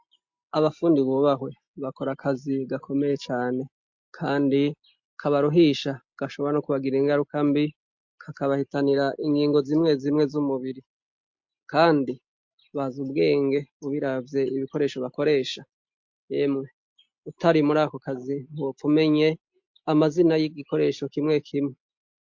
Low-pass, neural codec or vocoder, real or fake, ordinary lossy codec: 7.2 kHz; none; real; MP3, 48 kbps